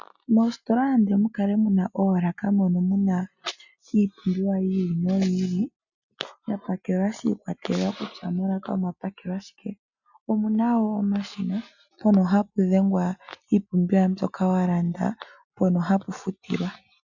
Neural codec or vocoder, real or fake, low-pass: none; real; 7.2 kHz